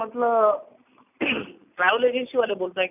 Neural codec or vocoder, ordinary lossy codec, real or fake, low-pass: none; none; real; 3.6 kHz